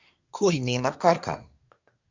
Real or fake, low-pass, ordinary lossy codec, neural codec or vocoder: fake; 7.2 kHz; MP3, 64 kbps; codec, 24 kHz, 1 kbps, SNAC